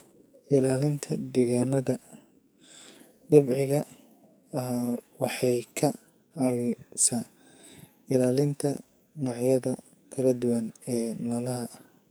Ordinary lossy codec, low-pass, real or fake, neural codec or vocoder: none; none; fake; codec, 44.1 kHz, 2.6 kbps, SNAC